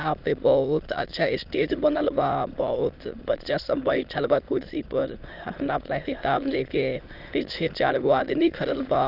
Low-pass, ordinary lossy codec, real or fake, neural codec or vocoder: 5.4 kHz; Opus, 24 kbps; fake; autoencoder, 22.05 kHz, a latent of 192 numbers a frame, VITS, trained on many speakers